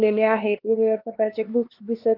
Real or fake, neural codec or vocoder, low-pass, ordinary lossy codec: fake; codec, 16 kHz, 2 kbps, X-Codec, HuBERT features, trained on LibriSpeech; 5.4 kHz; Opus, 32 kbps